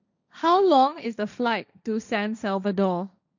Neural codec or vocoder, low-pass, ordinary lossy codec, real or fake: codec, 16 kHz, 1.1 kbps, Voila-Tokenizer; 7.2 kHz; none; fake